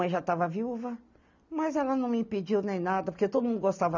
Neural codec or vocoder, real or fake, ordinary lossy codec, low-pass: none; real; none; 7.2 kHz